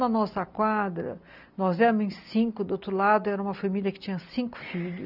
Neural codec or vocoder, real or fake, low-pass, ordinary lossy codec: none; real; 5.4 kHz; none